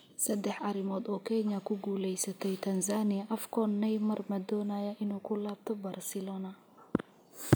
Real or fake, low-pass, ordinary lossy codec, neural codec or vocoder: real; none; none; none